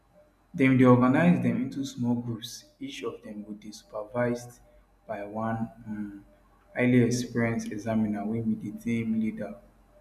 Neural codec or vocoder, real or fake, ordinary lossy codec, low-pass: none; real; none; 14.4 kHz